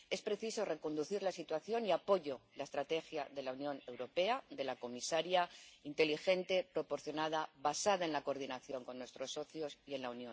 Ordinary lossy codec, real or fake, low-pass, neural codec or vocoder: none; real; none; none